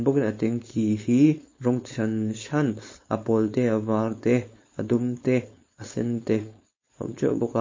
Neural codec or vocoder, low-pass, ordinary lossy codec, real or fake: codec, 16 kHz, 4.8 kbps, FACodec; 7.2 kHz; MP3, 32 kbps; fake